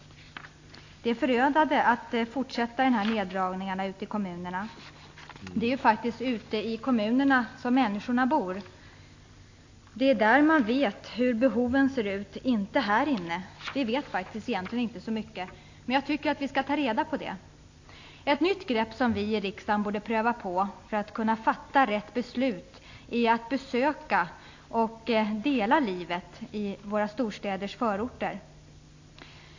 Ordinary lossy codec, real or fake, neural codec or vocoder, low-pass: AAC, 48 kbps; real; none; 7.2 kHz